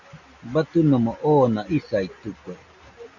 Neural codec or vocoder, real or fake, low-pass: none; real; 7.2 kHz